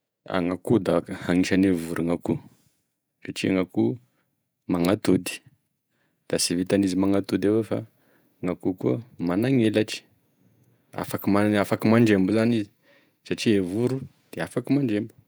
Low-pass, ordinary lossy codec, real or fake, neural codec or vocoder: none; none; fake; vocoder, 48 kHz, 128 mel bands, Vocos